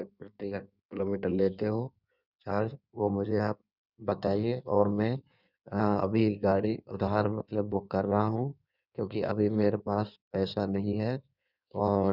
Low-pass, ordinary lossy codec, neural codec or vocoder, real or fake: 5.4 kHz; none; codec, 16 kHz in and 24 kHz out, 1.1 kbps, FireRedTTS-2 codec; fake